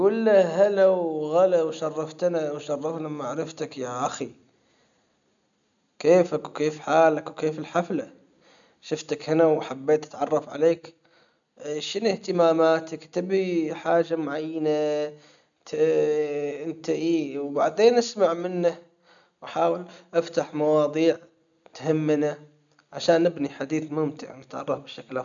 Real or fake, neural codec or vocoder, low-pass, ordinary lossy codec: real; none; 7.2 kHz; none